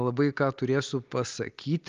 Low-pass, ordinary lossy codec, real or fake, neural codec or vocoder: 7.2 kHz; Opus, 32 kbps; real; none